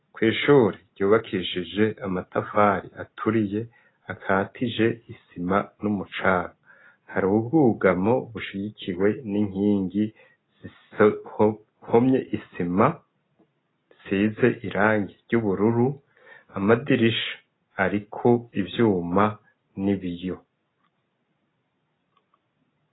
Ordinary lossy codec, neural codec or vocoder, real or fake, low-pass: AAC, 16 kbps; none; real; 7.2 kHz